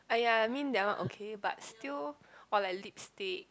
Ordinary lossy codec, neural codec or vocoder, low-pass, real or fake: none; none; none; real